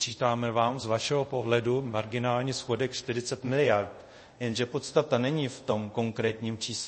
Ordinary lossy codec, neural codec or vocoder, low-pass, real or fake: MP3, 32 kbps; codec, 24 kHz, 0.5 kbps, DualCodec; 10.8 kHz; fake